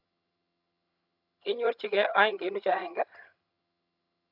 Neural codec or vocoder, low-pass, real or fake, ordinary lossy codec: vocoder, 22.05 kHz, 80 mel bands, HiFi-GAN; 5.4 kHz; fake; none